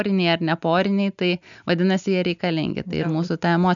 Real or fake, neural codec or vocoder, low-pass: real; none; 7.2 kHz